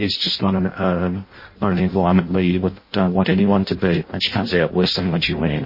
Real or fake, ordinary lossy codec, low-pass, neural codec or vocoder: fake; MP3, 24 kbps; 5.4 kHz; codec, 16 kHz in and 24 kHz out, 0.6 kbps, FireRedTTS-2 codec